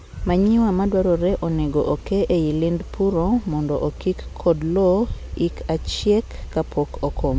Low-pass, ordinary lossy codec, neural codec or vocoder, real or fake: none; none; none; real